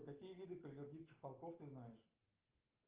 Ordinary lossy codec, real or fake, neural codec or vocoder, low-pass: Opus, 32 kbps; real; none; 3.6 kHz